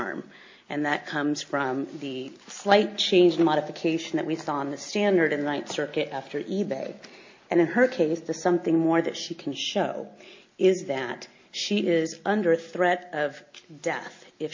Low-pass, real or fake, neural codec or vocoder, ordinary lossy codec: 7.2 kHz; real; none; MP3, 48 kbps